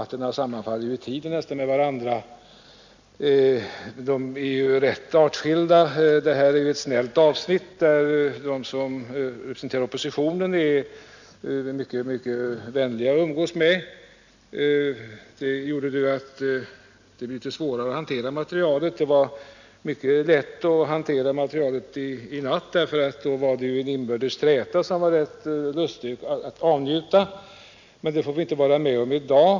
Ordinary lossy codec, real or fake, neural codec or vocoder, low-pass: none; real; none; 7.2 kHz